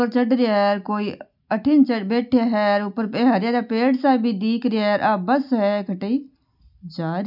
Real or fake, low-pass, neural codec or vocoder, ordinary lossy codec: real; 5.4 kHz; none; none